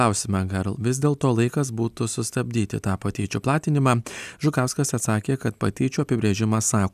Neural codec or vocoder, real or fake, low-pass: none; real; 14.4 kHz